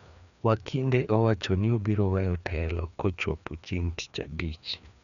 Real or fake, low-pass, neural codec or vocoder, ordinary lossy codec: fake; 7.2 kHz; codec, 16 kHz, 2 kbps, FreqCodec, larger model; none